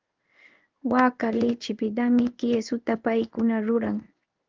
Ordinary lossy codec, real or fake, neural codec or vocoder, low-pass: Opus, 24 kbps; fake; codec, 16 kHz in and 24 kHz out, 1 kbps, XY-Tokenizer; 7.2 kHz